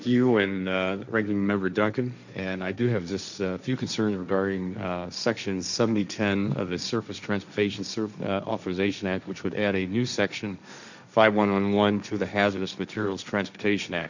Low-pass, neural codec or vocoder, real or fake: 7.2 kHz; codec, 16 kHz, 1.1 kbps, Voila-Tokenizer; fake